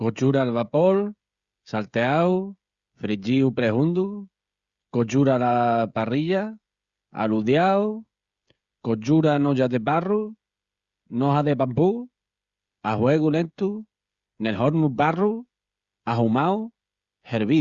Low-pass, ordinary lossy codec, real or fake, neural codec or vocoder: 7.2 kHz; Opus, 64 kbps; fake; codec, 16 kHz, 16 kbps, FreqCodec, smaller model